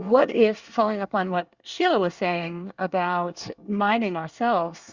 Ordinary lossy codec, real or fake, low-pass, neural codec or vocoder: Opus, 64 kbps; fake; 7.2 kHz; codec, 24 kHz, 1 kbps, SNAC